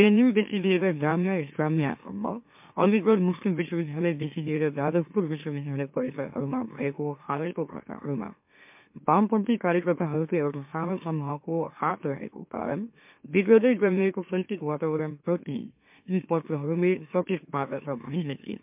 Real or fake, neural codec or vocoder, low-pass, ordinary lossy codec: fake; autoencoder, 44.1 kHz, a latent of 192 numbers a frame, MeloTTS; 3.6 kHz; MP3, 32 kbps